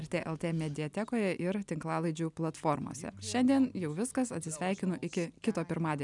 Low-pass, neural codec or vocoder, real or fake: 10.8 kHz; none; real